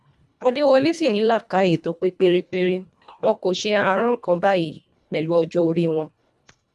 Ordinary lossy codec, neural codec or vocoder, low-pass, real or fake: none; codec, 24 kHz, 1.5 kbps, HILCodec; none; fake